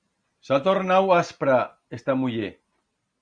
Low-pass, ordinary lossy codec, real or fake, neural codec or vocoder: 9.9 kHz; Opus, 64 kbps; real; none